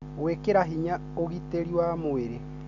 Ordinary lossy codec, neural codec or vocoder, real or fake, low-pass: none; none; real; 7.2 kHz